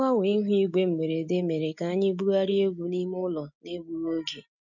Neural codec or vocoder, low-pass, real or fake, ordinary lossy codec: none; 7.2 kHz; real; none